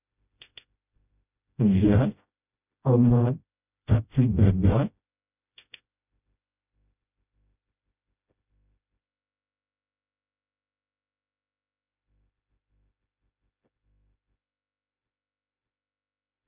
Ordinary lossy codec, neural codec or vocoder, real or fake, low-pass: none; codec, 16 kHz, 0.5 kbps, FreqCodec, smaller model; fake; 3.6 kHz